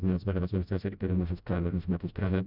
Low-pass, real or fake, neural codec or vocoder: 5.4 kHz; fake; codec, 16 kHz, 0.5 kbps, FreqCodec, smaller model